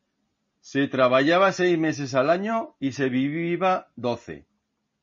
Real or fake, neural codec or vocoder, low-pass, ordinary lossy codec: real; none; 7.2 kHz; MP3, 32 kbps